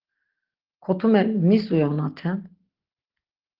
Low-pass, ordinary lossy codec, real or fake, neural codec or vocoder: 5.4 kHz; Opus, 16 kbps; real; none